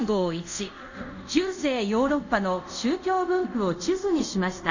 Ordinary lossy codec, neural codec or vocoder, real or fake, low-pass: none; codec, 24 kHz, 0.5 kbps, DualCodec; fake; 7.2 kHz